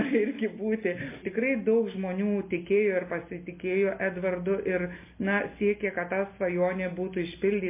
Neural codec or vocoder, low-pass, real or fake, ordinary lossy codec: none; 3.6 kHz; real; MP3, 24 kbps